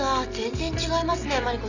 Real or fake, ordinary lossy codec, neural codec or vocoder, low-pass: real; none; none; 7.2 kHz